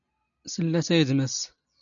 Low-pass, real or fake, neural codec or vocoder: 7.2 kHz; real; none